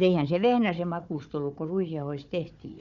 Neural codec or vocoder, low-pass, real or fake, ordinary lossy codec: codec, 16 kHz, 4 kbps, FunCodec, trained on Chinese and English, 50 frames a second; 7.2 kHz; fake; none